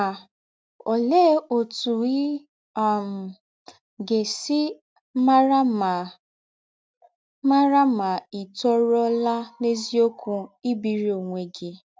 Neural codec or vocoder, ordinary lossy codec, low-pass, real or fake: none; none; none; real